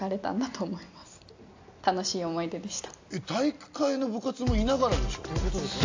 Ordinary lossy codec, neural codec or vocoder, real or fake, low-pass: AAC, 48 kbps; none; real; 7.2 kHz